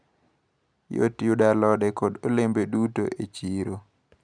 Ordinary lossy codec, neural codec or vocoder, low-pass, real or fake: none; none; 9.9 kHz; real